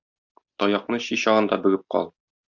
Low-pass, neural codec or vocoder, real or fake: 7.2 kHz; none; real